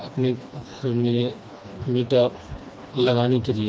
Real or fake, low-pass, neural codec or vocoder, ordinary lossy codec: fake; none; codec, 16 kHz, 2 kbps, FreqCodec, smaller model; none